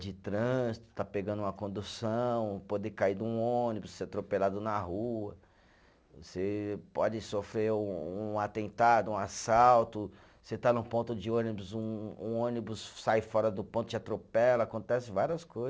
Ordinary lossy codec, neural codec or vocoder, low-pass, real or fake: none; none; none; real